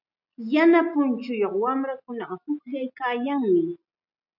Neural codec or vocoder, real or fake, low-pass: none; real; 5.4 kHz